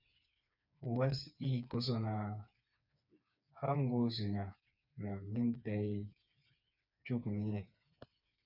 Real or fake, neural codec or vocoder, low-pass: fake; codec, 16 kHz, 4 kbps, FreqCodec, smaller model; 5.4 kHz